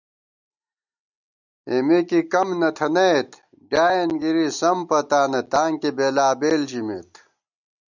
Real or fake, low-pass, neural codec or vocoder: real; 7.2 kHz; none